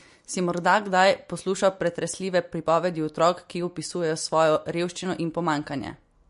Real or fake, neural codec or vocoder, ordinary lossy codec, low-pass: real; none; MP3, 48 kbps; 10.8 kHz